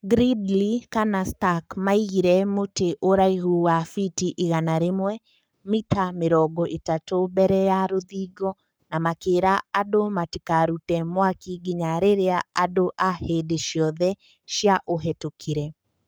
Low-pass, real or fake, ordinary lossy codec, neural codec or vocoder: none; fake; none; codec, 44.1 kHz, 7.8 kbps, Pupu-Codec